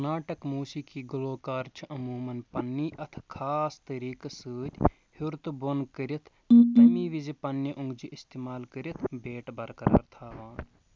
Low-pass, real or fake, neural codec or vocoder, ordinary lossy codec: none; real; none; none